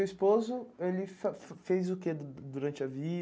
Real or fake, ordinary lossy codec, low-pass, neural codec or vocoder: real; none; none; none